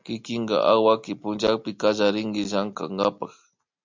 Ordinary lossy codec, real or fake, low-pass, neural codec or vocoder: MP3, 64 kbps; real; 7.2 kHz; none